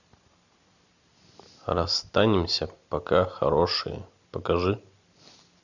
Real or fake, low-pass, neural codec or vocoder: real; 7.2 kHz; none